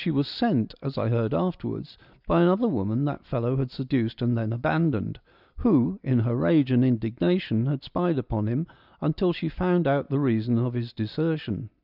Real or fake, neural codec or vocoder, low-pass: real; none; 5.4 kHz